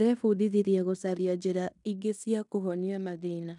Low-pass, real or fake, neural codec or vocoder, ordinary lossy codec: 10.8 kHz; fake; codec, 16 kHz in and 24 kHz out, 0.9 kbps, LongCat-Audio-Codec, fine tuned four codebook decoder; none